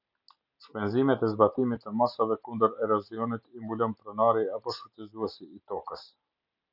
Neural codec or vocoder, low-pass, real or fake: none; 5.4 kHz; real